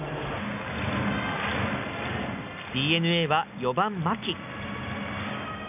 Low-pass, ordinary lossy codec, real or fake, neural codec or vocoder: 3.6 kHz; none; real; none